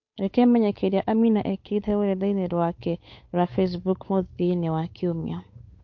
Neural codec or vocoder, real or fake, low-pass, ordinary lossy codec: codec, 16 kHz, 8 kbps, FunCodec, trained on Chinese and English, 25 frames a second; fake; 7.2 kHz; MP3, 48 kbps